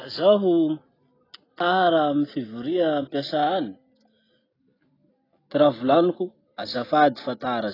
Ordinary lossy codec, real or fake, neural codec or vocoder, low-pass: AAC, 24 kbps; real; none; 5.4 kHz